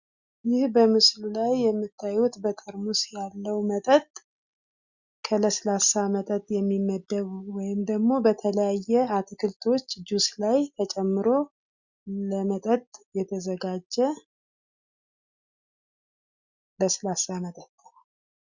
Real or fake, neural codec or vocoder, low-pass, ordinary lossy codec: real; none; 7.2 kHz; Opus, 64 kbps